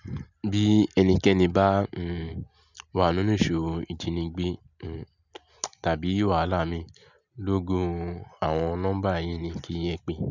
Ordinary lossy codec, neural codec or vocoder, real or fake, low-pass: none; none; real; 7.2 kHz